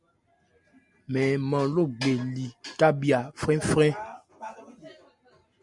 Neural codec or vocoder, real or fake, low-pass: none; real; 10.8 kHz